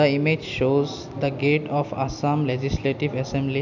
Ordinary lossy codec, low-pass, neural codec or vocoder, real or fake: none; 7.2 kHz; none; real